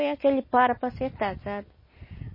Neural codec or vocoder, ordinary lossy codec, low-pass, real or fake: none; MP3, 24 kbps; 5.4 kHz; real